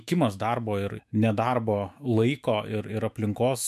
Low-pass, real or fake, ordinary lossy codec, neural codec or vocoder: 14.4 kHz; fake; MP3, 96 kbps; autoencoder, 48 kHz, 128 numbers a frame, DAC-VAE, trained on Japanese speech